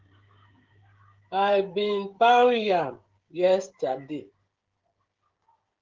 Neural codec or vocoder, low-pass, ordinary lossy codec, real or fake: codec, 16 kHz, 16 kbps, FreqCodec, smaller model; 7.2 kHz; Opus, 16 kbps; fake